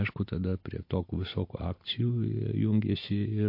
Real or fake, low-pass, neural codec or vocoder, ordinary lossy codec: real; 5.4 kHz; none; MP3, 32 kbps